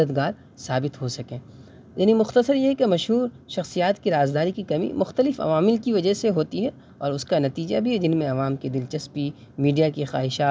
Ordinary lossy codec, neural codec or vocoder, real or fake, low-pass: none; none; real; none